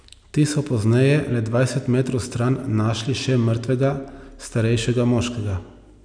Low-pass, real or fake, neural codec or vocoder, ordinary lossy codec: 9.9 kHz; real; none; none